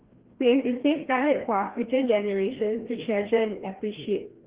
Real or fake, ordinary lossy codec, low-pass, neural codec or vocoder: fake; Opus, 16 kbps; 3.6 kHz; codec, 16 kHz, 1 kbps, FreqCodec, larger model